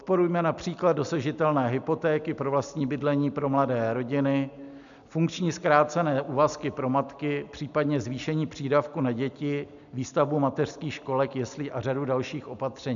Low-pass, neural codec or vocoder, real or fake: 7.2 kHz; none; real